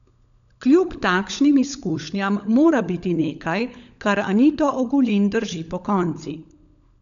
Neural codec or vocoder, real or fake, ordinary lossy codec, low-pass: codec, 16 kHz, 16 kbps, FunCodec, trained on LibriTTS, 50 frames a second; fake; none; 7.2 kHz